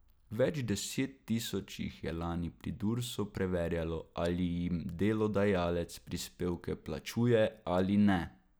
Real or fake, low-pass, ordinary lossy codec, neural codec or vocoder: real; none; none; none